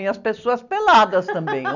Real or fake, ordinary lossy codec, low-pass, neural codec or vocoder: real; none; 7.2 kHz; none